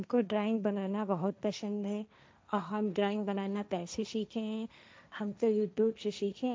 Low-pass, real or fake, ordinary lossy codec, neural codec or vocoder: none; fake; none; codec, 16 kHz, 1.1 kbps, Voila-Tokenizer